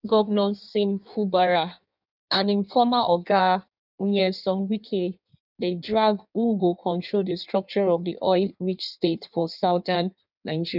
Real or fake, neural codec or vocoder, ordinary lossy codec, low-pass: fake; codec, 16 kHz in and 24 kHz out, 1.1 kbps, FireRedTTS-2 codec; none; 5.4 kHz